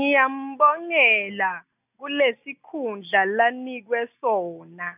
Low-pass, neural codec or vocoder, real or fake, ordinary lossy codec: 3.6 kHz; none; real; none